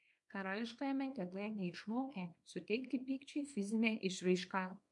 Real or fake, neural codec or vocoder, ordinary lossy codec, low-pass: fake; codec, 24 kHz, 0.9 kbps, WavTokenizer, small release; MP3, 64 kbps; 10.8 kHz